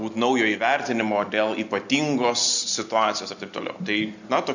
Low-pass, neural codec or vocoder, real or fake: 7.2 kHz; none; real